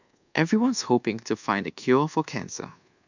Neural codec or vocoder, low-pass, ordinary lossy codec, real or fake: codec, 24 kHz, 1.2 kbps, DualCodec; 7.2 kHz; none; fake